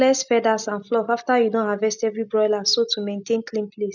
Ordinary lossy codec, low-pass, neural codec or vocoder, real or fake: none; 7.2 kHz; none; real